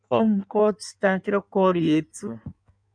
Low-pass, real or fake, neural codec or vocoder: 9.9 kHz; fake; codec, 16 kHz in and 24 kHz out, 1.1 kbps, FireRedTTS-2 codec